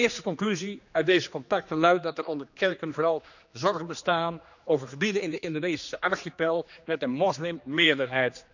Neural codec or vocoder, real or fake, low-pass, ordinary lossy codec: codec, 16 kHz, 2 kbps, X-Codec, HuBERT features, trained on general audio; fake; 7.2 kHz; none